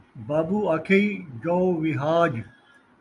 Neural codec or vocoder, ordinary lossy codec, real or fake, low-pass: vocoder, 44.1 kHz, 128 mel bands every 256 samples, BigVGAN v2; AAC, 64 kbps; fake; 10.8 kHz